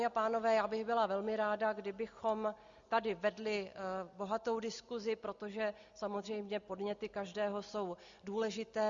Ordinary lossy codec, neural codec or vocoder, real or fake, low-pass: Opus, 64 kbps; none; real; 7.2 kHz